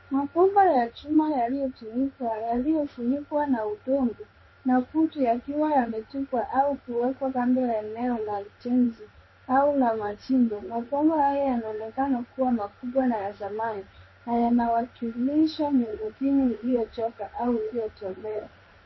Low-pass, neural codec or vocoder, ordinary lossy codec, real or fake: 7.2 kHz; codec, 16 kHz in and 24 kHz out, 1 kbps, XY-Tokenizer; MP3, 24 kbps; fake